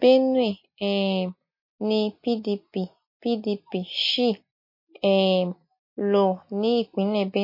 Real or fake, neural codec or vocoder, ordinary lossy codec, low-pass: real; none; MP3, 32 kbps; 5.4 kHz